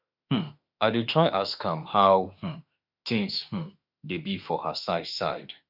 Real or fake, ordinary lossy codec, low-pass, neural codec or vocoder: fake; none; 5.4 kHz; autoencoder, 48 kHz, 32 numbers a frame, DAC-VAE, trained on Japanese speech